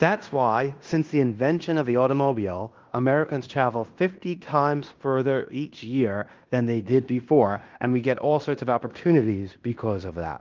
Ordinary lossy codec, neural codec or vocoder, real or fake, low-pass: Opus, 32 kbps; codec, 16 kHz in and 24 kHz out, 0.9 kbps, LongCat-Audio-Codec, fine tuned four codebook decoder; fake; 7.2 kHz